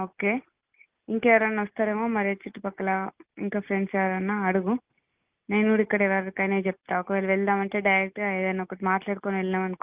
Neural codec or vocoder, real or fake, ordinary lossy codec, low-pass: none; real; Opus, 32 kbps; 3.6 kHz